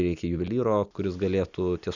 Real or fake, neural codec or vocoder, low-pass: real; none; 7.2 kHz